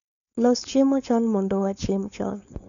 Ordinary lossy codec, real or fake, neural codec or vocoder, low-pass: none; fake; codec, 16 kHz, 4.8 kbps, FACodec; 7.2 kHz